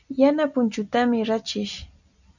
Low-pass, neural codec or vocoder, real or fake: 7.2 kHz; none; real